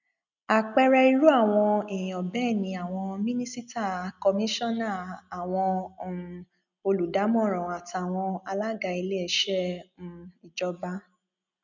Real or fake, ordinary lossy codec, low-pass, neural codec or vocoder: real; none; 7.2 kHz; none